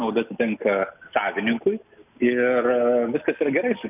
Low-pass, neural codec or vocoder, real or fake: 3.6 kHz; none; real